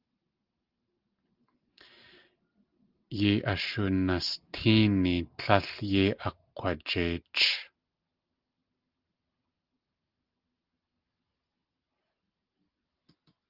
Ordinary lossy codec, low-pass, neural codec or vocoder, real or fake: Opus, 32 kbps; 5.4 kHz; none; real